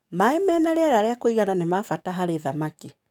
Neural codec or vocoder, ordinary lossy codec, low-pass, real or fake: codec, 44.1 kHz, 7.8 kbps, DAC; none; 19.8 kHz; fake